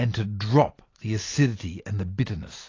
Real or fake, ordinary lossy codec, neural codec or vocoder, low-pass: real; AAC, 32 kbps; none; 7.2 kHz